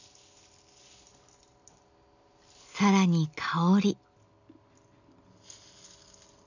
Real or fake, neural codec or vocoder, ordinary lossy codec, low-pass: real; none; none; 7.2 kHz